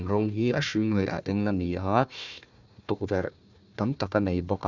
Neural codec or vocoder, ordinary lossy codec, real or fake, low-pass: codec, 16 kHz, 1 kbps, FunCodec, trained on Chinese and English, 50 frames a second; none; fake; 7.2 kHz